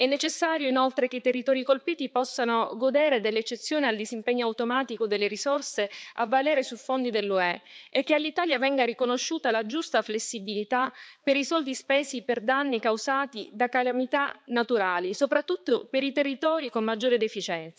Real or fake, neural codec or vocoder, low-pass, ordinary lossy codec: fake; codec, 16 kHz, 4 kbps, X-Codec, HuBERT features, trained on balanced general audio; none; none